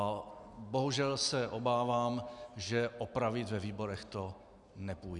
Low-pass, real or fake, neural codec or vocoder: 10.8 kHz; real; none